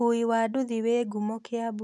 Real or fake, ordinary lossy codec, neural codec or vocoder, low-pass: real; none; none; none